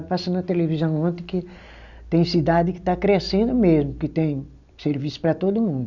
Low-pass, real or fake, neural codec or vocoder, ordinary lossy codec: 7.2 kHz; real; none; none